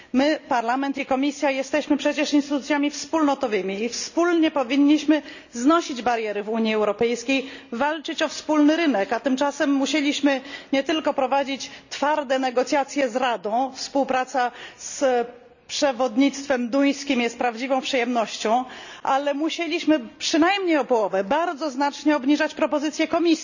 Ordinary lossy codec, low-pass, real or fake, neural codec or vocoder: none; 7.2 kHz; real; none